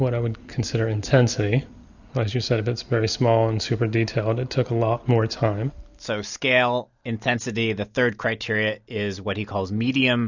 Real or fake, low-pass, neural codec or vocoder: real; 7.2 kHz; none